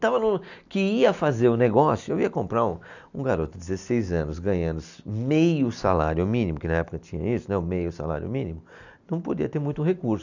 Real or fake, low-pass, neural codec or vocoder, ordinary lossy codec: real; 7.2 kHz; none; none